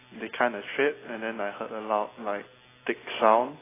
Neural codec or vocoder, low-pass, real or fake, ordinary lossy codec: none; 3.6 kHz; real; AAC, 16 kbps